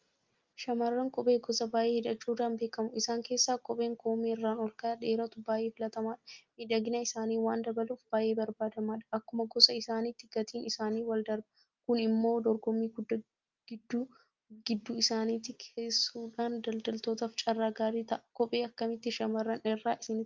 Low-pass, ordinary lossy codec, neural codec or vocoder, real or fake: 7.2 kHz; Opus, 32 kbps; none; real